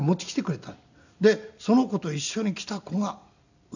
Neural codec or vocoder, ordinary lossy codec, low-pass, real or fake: none; none; 7.2 kHz; real